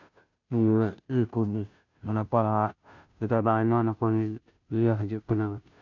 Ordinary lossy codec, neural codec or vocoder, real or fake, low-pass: none; codec, 16 kHz, 0.5 kbps, FunCodec, trained on Chinese and English, 25 frames a second; fake; 7.2 kHz